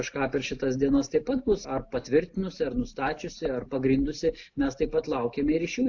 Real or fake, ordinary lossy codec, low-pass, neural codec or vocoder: real; AAC, 48 kbps; 7.2 kHz; none